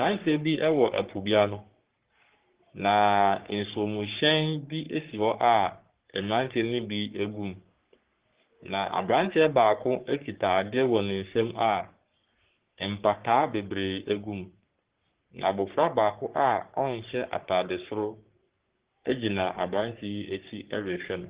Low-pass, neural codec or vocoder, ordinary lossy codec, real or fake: 3.6 kHz; codec, 44.1 kHz, 3.4 kbps, Pupu-Codec; Opus, 16 kbps; fake